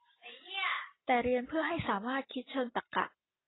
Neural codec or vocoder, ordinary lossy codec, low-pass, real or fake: none; AAC, 16 kbps; 7.2 kHz; real